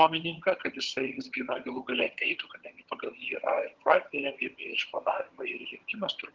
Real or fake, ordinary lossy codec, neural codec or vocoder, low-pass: fake; Opus, 16 kbps; vocoder, 22.05 kHz, 80 mel bands, HiFi-GAN; 7.2 kHz